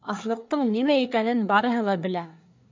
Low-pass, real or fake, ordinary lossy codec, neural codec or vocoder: 7.2 kHz; fake; MP3, 64 kbps; codec, 24 kHz, 1 kbps, SNAC